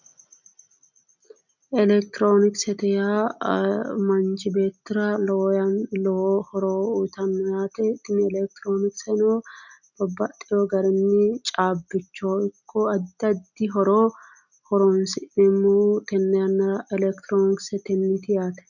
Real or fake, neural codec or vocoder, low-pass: real; none; 7.2 kHz